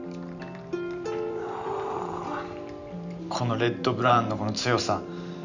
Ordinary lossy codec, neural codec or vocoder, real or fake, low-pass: none; vocoder, 44.1 kHz, 128 mel bands every 512 samples, BigVGAN v2; fake; 7.2 kHz